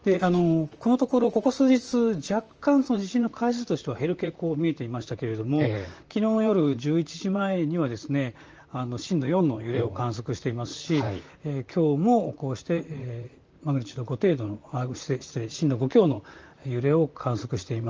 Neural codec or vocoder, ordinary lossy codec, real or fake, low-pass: vocoder, 44.1 kHz, 128 mel bands, Pupu-Vocoder; Opus, 24 kbps; fake; 7.2 kHz